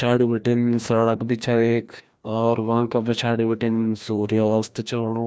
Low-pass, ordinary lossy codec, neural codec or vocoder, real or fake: none; none; codec, 16 kHz, 1 kbps, FreqCodec, larger model; fake